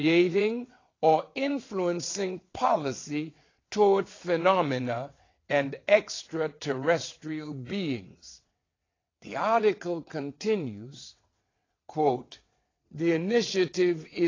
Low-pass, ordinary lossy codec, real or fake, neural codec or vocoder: 7.2 kHz; AAC, 32 kbps; fake; vocoder, 22.05 kHz, 80 mel bands, WaveNeXt